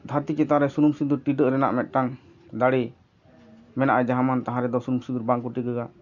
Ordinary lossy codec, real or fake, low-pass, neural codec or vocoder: none; real; 7.2 kHz; none